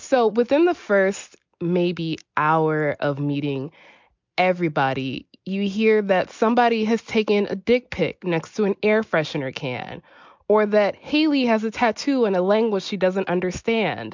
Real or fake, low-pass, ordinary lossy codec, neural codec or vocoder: real; 7.2 kHz; MP3, 64 kbps; none